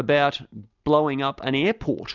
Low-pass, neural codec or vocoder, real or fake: 7.2 kHz; none; real